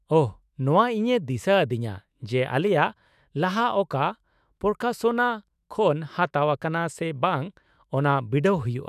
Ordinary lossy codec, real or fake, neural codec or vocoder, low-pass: none; fake; autoencoder, 48 kHz, 128 numbers a frame, DAC-VAE, trained on Japanese speech; 14.4 kHz